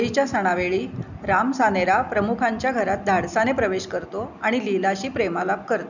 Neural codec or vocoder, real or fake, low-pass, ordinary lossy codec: none; real; 7.2 kHz; none